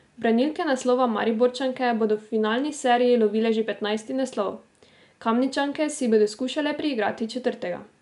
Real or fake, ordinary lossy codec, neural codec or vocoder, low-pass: real; none; none; 10.8 kHz